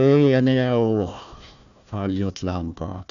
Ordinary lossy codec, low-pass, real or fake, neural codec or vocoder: none; 7.2 kHz; fake; codec, 16 kHz, 1 kbps, FunCodec, trained on Chinese and English, 50 frames a second